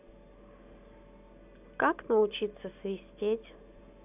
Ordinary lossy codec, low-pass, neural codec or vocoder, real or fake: none; 3.6 kHz; none; real